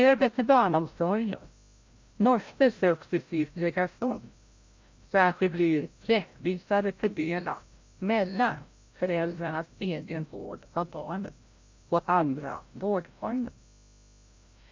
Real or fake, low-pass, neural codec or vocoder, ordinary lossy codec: fake; 7.2 kHz; codec, 16 kHz, 0.5 kbps, FreqCodec, larger model; MP3, 48 kbps